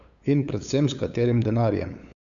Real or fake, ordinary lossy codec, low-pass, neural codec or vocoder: fake; none; 7.2 kHz; codec, 16 kHz, 8 kbps, FunCodec, trained on LibriTTS, 25 frames a second